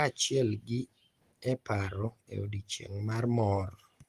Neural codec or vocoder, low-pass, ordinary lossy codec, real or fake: none; 14.4 kHz; Opus, 24 kbps; real